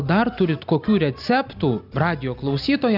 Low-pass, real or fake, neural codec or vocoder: 5.4 kHz; real; none